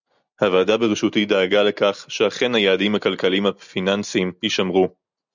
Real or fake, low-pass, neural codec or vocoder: real; 7.2 kHz; none